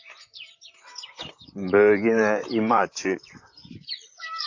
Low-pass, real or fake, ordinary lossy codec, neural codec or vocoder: 7.2 kHz; fake; AAC, 48 kbps; vocoder, 44.1 kHz, 128 mel bands, Pupu-Vocoder